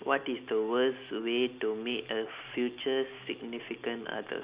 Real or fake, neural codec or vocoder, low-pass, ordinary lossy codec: real; none; 3.6 kHz; Opus, 64 kbps